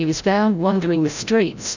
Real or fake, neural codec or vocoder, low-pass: fake; codec, 16 kHz, 0.5 kbps, FreqCodec, larger model; 7.2 kHz